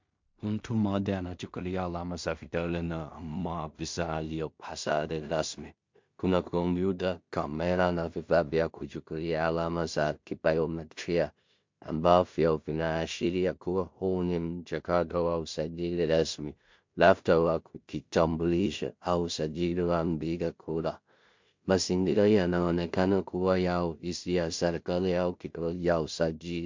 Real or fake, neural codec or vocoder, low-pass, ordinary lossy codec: fake; codec, 16 kHz in and 24 kHz out, 0.4 kbps, LongCat-Audio-Codec, two codebook decoder; 7.2 kHz; MP3, 48 kbps